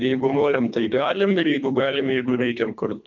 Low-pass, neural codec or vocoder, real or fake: 7.2 kHz; codec, 24 kHz, 1.5 kbps, HILCodec; fake